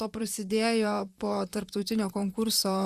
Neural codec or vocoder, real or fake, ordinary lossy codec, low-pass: vocoder, 44.1 kHz, 128 mel bands, Pupu-Vocoder; fake; Opus, 64 kbps; 14.4 kHz